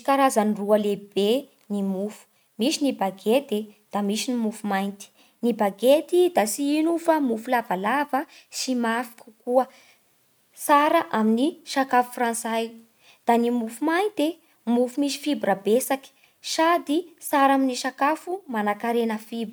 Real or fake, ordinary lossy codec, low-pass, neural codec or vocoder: real; none; none; none